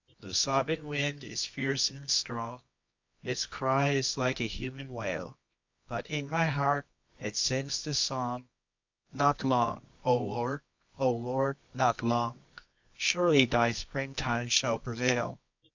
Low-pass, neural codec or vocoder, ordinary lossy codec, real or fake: 7.2 kHz; codec, 24 kHz, 0.9 kbps, WavTokenizer, medium music audio release; MP3, 64 kbps; fake